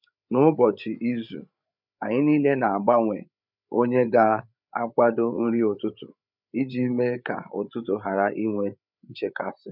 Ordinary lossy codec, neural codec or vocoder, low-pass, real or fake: none; codec, 16 kHz, 8 kbps, FreqCodec, larger model; 5.4 kHz; fake